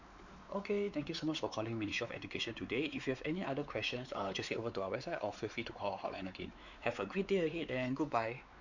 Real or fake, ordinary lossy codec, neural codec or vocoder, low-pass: fake; none; codec, 16 kHz, 4 kbps, X-Codec, WavLM features, trained on Multilingual LibriSpeech; 7.2 kHz